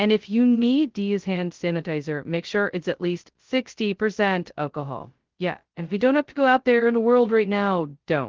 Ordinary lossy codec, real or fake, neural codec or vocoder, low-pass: Opus, 16 kbps; fake; codec, 16 kHz, 0.2 kbps, FocalCodec; 7.2 kHz